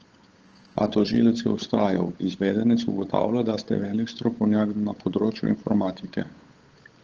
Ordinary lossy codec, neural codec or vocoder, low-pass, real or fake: Opus, 16 kbps; codec, 16 kHz, 8 kbps, FunCodec, trained on Chinese and English, 25 frames a second; 7.2 kHz; fake